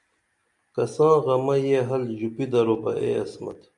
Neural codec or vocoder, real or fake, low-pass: none; real; 10.8 kHz